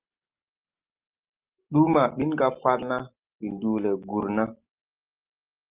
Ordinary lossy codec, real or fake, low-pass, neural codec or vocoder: Opus, 24 kbps; real; 3.6 kHz; none